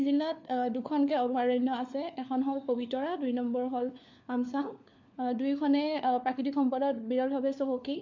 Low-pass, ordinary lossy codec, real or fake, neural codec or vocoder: 7.2 kHz; MP3, 48 kbps; fake; codec, 16 kHz, 4 kbps, FunCodec, trained on LibriTTS, 50 frames a second